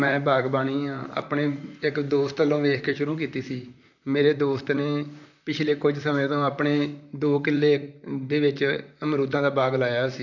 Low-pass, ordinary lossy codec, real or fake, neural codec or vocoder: 7.2 kHz; none; fake; vocoder, 44.1 kHz, 128 mel bands, Pupu-Vocoder